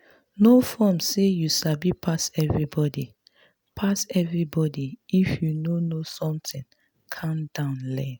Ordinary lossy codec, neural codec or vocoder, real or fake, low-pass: none; none; real; none